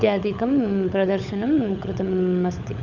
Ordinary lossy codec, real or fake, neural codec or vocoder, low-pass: none; fake; codec, 16 kHz, 16 kbps, FunCodec, trained on LibriTTS, 50 frames a second; 7.2 kHz